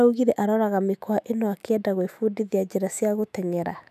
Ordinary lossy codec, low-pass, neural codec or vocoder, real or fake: none; 14.4 kHz; autoencoder, 48 kHz, 128 numbers a frame, DAC-VAE, trained on Japanese speech; fake